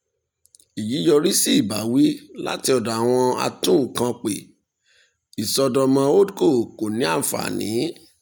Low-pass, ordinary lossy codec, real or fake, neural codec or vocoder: none; none; real; none